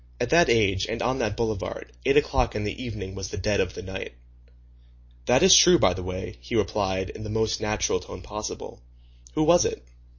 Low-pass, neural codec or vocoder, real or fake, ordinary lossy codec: 7.2 kHz; none; real; MP3, 32 kbps